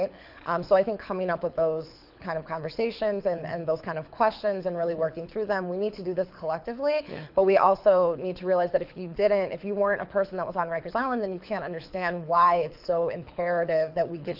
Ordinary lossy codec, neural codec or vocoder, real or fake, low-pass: AAC, 48 kbps; codec, 24 kHz, 6 kbps, HILCodec; fake; 5.4 kHz